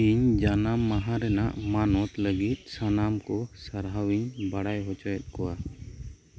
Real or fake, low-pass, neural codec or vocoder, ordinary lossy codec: real; none; none; none